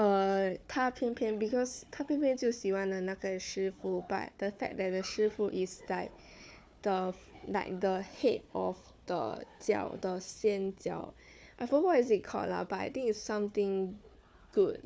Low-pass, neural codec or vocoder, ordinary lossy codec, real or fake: none; codec, 16 kHz, 4 kbps, FunCodec, trained on Chinese and English, 50 frames a second; none; fake